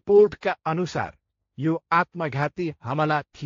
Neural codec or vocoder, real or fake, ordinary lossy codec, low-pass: codec, 16 kHz, 1.1 kbps, Voila-Tokenizer; fake; AAC, 48 kbps; 7.2 kHz